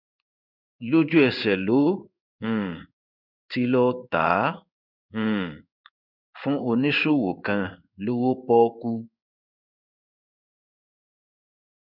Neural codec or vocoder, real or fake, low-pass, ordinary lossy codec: codec, 16 kHz in and 24 kHz out, 1 kbps, XY-Tokenizer; fake; 5.4 kHz; none